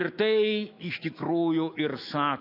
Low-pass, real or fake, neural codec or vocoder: 5.4 kHz; fake; autoencoder, 48 kHz, 128 numbers a frame, DAC-VAE, trained on Japanese speech